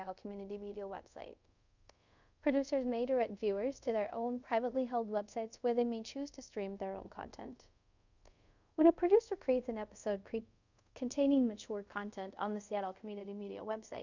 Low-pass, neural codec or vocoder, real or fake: 7.2 kHz; codec, 24 kHz, 0.5 kbps, DualCodec; fake